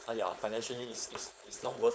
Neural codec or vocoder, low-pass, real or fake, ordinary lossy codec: codec, 16 kHz, 4.8 kbps, FACodec; none; fake; none